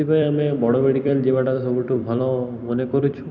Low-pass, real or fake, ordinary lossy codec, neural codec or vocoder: 7.2 kHz; fake; none; vocoder, 44.1 kHz, 128 mel bands every 256 samples, BigVGAN v2